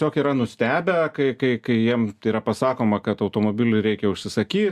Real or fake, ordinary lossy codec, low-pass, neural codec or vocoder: real; Opus, 64 kbps; 14.4 kHz; none